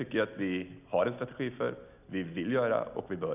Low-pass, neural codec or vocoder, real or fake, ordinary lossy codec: 3.6 kHz; none; real; none